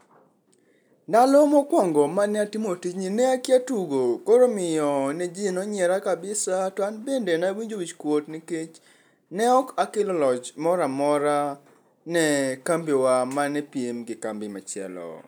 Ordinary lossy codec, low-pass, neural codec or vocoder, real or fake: none; none; none; real